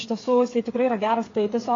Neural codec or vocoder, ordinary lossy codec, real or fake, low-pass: codec, 16 kHz, 8 kbps, FreqCodec, smaller model; AAC, 32 kbps; fake; 7.2 kHz